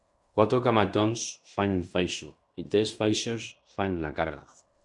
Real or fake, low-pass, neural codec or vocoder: fake; 10.8 kHz; codec, 16 kHz in and 24 kHz out, 0.9 kbps, LongCat-Audio-Codec, fine tuned four codebook decoder